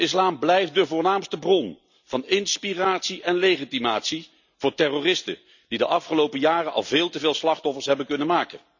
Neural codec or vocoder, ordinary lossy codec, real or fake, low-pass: none; none; real; 7.2 kHz